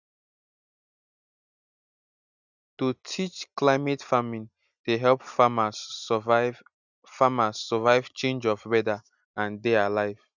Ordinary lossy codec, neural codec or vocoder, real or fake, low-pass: none; none; real; 7.2 kHz